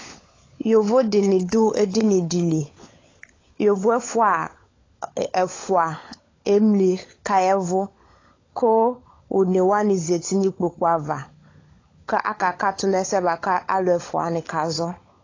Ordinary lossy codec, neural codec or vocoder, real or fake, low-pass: AAC, 32 kbps; codec, 16 kHz, 16 kbps, FunCodec, trained on LibriTTS, 50 frames a second; fake; 7.2 kHz